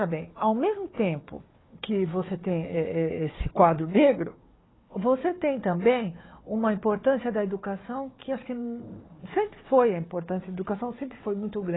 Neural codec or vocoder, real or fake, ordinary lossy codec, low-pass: codec, 16 kHz, 4 kbps, FunCodec, trained on Chinese and English, 50 frames a second; fake; AAC, 16 kbps; 7.2 kHz